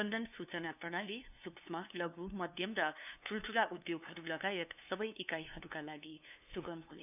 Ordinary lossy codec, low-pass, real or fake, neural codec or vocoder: none; 3.6 kHz; fake; codec, 16 kHz, 2 kbps, FunCodec, trained on LibriTTS, 25 frames a second